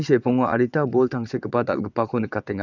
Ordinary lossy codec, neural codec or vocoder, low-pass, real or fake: none; vocoder, 22.05 kHz, 80 mel bands, WaveNeXt; 7.2 kHz; fake